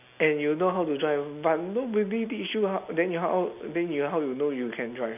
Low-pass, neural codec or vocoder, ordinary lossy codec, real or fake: 3.6 kHz; none; none; real